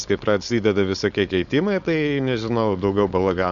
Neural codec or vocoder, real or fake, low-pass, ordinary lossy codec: codec, 16 kHz, 4.8 kbps, FACodec; fake; 7.2 kHz; MP3, 96 kbps